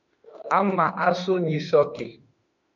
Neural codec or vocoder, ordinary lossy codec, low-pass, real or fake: autoencoder, 48 kHz, 32 numbers a frame, DAC-VAE, trained on Japanese speech; MP3, 64 kbps; 7.2 kHz; fake